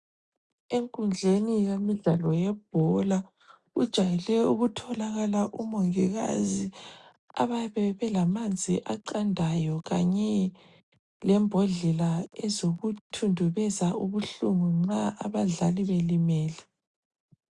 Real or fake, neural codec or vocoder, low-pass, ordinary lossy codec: real; none; 10.8 kHz; Opus, 64 kbps